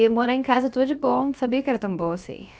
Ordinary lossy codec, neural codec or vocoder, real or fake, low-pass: none; codec, 16 kHz, about 1 kbps, DyCAST, with the encoder's durations; fake; none